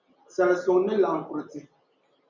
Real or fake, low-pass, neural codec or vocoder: real; 7.2 kHz; none